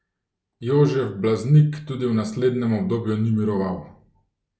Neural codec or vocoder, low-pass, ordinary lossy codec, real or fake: none; none; none; real